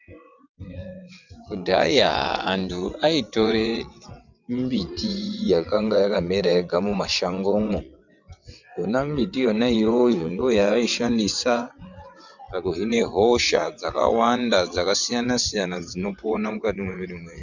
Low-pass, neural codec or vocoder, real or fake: 7.2 kHz; vocoder, 22.05 kHz, 80 mel bands, WaveNeXt; fake